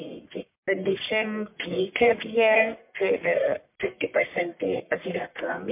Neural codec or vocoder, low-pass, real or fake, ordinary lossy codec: codec, 44.1 kHz, 1.7 kbps, Pupu-Codec; 3.6 kHz; fake; MP3, 32 kbps